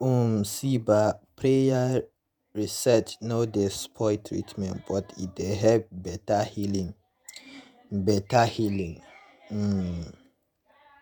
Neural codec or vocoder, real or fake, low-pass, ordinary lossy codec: vocoder, 48 kHz, 128 mel bands, Vocos; fake; none; none